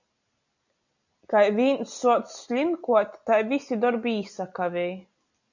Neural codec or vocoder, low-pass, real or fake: none; 7.2 kHz; real